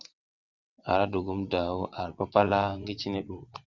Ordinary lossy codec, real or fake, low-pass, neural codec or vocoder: Opus, 64 kbps; fake; 7.2 kHz; vocoder, 22.05 kHz, 80 mel bands, WaveNeXt